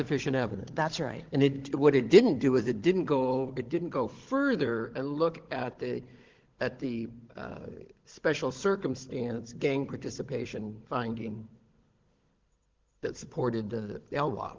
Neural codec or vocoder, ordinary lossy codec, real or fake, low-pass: codec, 16 kHz, 16 kbps, FunCodec, trained on Chinese and English, 50 frames a second; Opus, 16 kbps; fake; 7.2 kHz